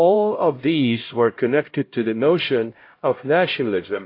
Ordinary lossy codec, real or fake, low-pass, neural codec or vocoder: AAC, 32 kbps; fake; 5.4 kHz; codec, 16 kHz, 0.5 kbps, X-Codec, HuBERT features, trained on LibriSpeech